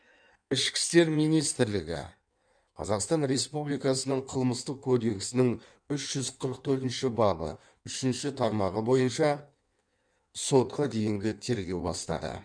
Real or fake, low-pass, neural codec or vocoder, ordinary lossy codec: fake; 9.9 kHz; codec, 16 kHz in and 24 kHz out, 1.1 kbps, FireRedTTS-2 codec; none